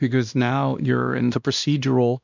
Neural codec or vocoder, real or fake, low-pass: codec, 16 kHz, 1 kbps, X-Codec, WavLM features, trained on Multilingual LibriSpeech; fake; 7.2 kHz